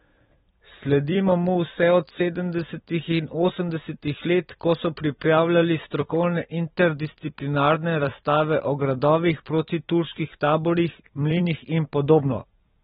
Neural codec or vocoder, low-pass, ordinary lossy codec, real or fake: vocoder, 44.1 kHz, 128 mel bands every 256 samples, BigVGAN v2; 19.8 kHz; AAC, 16 kbps; fake